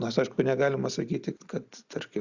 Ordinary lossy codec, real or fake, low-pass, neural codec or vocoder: Opus, 64 kbps; real; 7.2 kHz; none